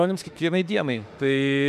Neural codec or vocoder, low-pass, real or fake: autoencoder, 48 kHz, 32 numbers a frame, DAC-VAE, trained on Japanese speech; 14.4 kHz; fake